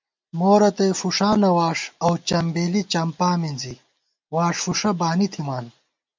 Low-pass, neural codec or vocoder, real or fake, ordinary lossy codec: 7.2 kHz; none; real; MP3, 64 kbps